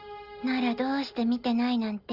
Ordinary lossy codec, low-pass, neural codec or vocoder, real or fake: Opus, 32 kbps; 5.4 kHz; none; real